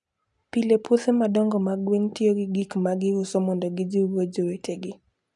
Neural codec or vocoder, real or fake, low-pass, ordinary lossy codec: none; real; 10.8 kHz; none